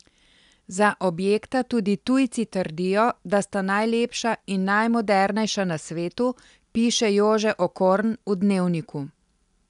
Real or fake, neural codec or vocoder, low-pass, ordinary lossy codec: real; none; 10.8 kHz; none